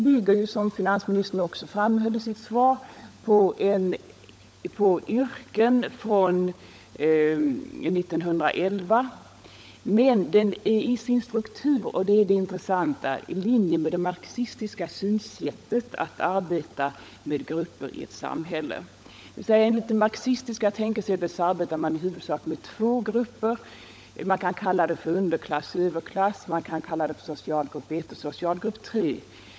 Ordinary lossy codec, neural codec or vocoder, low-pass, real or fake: none; codec, 16 kHz, 16 kbps, FunCodec, trained on LibriTTS, 50 frames a second; none; fake